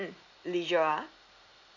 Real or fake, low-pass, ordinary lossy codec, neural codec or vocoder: real; 7.2 kHz; none; none